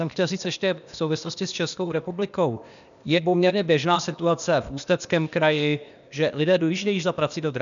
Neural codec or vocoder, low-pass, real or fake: codec, 16 kHz, 0.8 kbps, ZipCodec; 7.2 kHz; fake